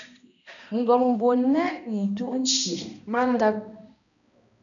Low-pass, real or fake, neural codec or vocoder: 7.2 kHz; fake; codec, 16 kHz, 1 kbps, X-Codec, HuBERT features, trained on balanced general audio